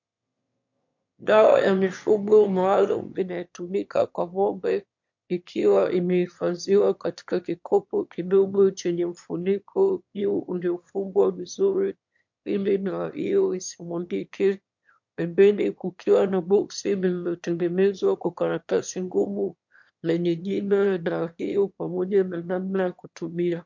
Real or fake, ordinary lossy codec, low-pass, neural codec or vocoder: fake; MP3, 48 kbps; 7.2 kHz; autoencoder, 22.05 kHz, a latent of 192 numbers a frame, VITS, trained on one speaker